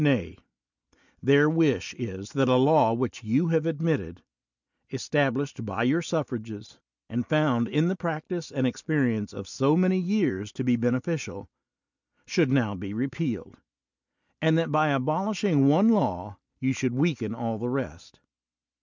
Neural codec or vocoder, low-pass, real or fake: none; 7.2 kHz; real